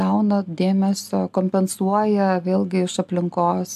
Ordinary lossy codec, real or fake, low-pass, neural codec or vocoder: AAC, 96 kbps; real; 14.4 kHz; none